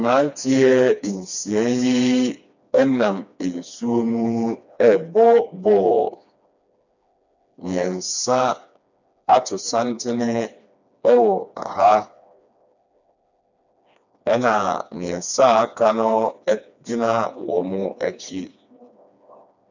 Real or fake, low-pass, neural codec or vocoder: fake; 7.2 kHz; codec, 16 kHz, 2 kbps, FreqCodec, smaller model